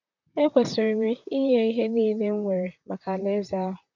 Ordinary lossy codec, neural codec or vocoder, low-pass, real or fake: none; vocoder, 44.1 kHz, 128 mel bands, Pupu-Vocoder; 7.2 kHz; fake